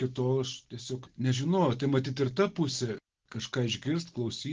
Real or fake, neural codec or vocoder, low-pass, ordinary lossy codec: real; none; 7.2 kHz; Opus, 16 kbps